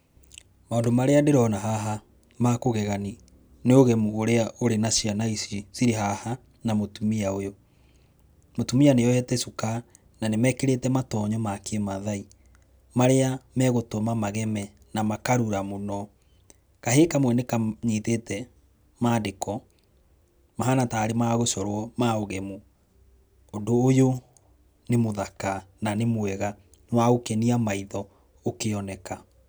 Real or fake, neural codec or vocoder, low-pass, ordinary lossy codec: real; none; none; none